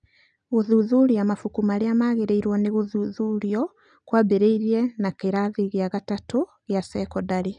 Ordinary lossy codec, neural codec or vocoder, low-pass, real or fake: none; none; 9.9 kHz; real